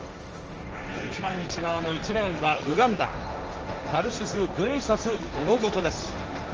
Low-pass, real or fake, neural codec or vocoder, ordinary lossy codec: 7.2 kHz; fake; codec, 16 kHz, 1.1 kbps, Voila-Tokenizer; Opus, 24 kbps